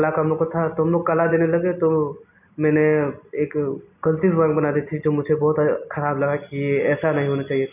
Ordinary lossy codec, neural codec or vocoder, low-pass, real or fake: none; none; 3.6 kHz; real